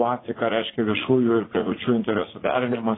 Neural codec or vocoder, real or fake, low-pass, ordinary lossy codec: vocoder, 44.1 kHz, 80 mel bands, Vocos; fake; 7.2 kHz; AAC, 16 kbps